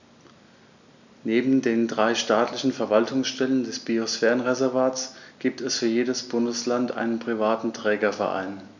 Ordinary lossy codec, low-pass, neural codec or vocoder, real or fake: none; 7.2 kHz; none; real